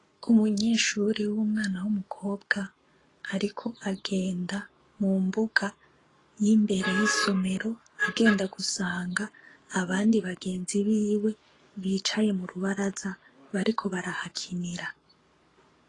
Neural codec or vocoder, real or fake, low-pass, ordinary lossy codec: codec, 44.1 kHz, 7.8 kbps, Pupu-Codec; fake; 10.8 kHz; AAC, 32 kbps